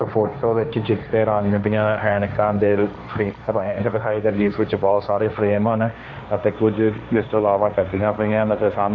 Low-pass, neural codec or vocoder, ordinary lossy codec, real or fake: none; codec, 16 kHz, 1.1 kbps, Voila-Tokenizer; none; fake